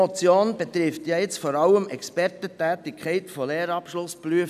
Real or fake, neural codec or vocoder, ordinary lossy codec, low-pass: real; none; none; 14.4 kHz